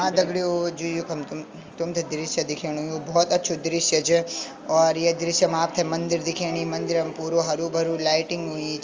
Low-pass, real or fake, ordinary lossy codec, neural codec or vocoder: 7.2 kHz; real; Opus, 32 kbps; none